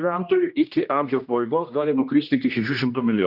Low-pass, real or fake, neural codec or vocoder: 5.4 kHz; fake; codec, 16 kHz, 1 kbps, X-Codec, HuBERT features, trained on general audio